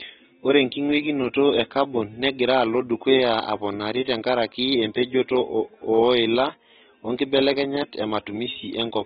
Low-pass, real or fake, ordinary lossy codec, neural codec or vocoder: 19.8 kHz; real; AAC, 16 kbps; none